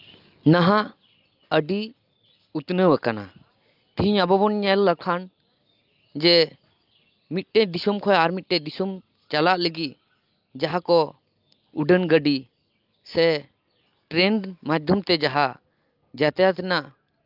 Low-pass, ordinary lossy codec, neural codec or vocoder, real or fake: 5.4 kHz; Opus, 24 kbps; none; real